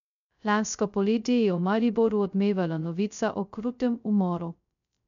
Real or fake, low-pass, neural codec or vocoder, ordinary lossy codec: fake; 7.2 kHz; codec, 16 kHz, 0.2 kbps, FocalCodec; none